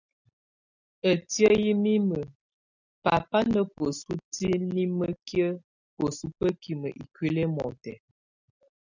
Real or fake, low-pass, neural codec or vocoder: real; 7.2 kHz; none